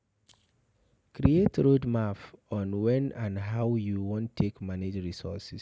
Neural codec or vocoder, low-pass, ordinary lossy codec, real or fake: none; none; none; real